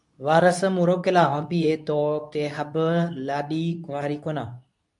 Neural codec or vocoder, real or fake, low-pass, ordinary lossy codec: codec, 24 kHz, 0.9 kbps, WavTokenizer, medium speech release version 2; fake; 10.8 kHz; MP3, 64 kbps